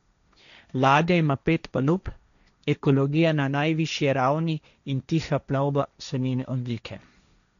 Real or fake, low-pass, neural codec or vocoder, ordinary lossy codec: fake; 7.2 kHz; codec, 16 kHz, 1.1 kbps, Voila-Tokenizer; none